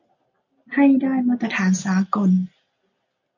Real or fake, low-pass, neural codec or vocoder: real; 7.2 kHz; none